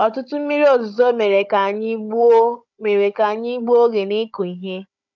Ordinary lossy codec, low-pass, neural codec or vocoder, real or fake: none; 7.2 kHz; codec, 16 kHz, 16 kbps, FunCodec, trained on Chinese and English, 50 frames a second; fake